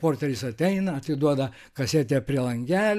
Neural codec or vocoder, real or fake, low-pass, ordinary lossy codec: none; real; 14.4 kHz; AAC, 96 kbps